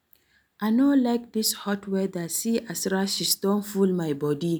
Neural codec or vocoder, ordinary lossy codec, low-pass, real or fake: none; none; none; real